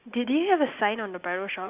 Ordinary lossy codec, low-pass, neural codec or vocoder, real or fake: Opus, 64 kbps; 3.6 kHz; none; real